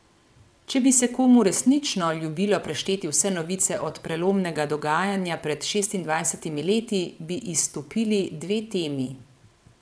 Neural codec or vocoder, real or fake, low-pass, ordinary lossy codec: vocoder, 22.05 kHz, 80 mel bands, WaveNeXt; fake; none; none